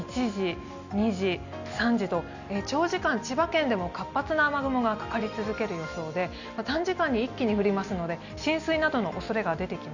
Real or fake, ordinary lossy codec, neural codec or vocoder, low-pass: real; none; none; 7.2 kHz